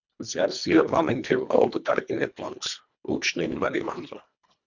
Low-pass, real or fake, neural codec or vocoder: 7.2 kHz; fake; codec, 24 kHz, 1.5 kbps, HILCodec